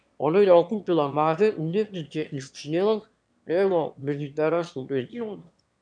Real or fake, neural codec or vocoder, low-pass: fake; autoencoder, 22.05 kHz, a latent of 192 numbers a frame, VITS, trained on one speaker; 9.9 kHz